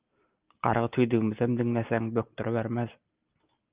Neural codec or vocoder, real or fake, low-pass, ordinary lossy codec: none; real; 3.6 kHz; Opus, 32 kbps